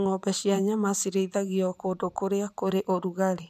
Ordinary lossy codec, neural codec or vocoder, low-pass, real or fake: none; vocoder, 44.1 kHz, 128 mel bands every 512 samples, BigVGAN v2; 14.4 kHz; fake